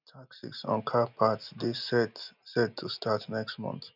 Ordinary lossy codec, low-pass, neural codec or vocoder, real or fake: none; 5.4 kHz; none; real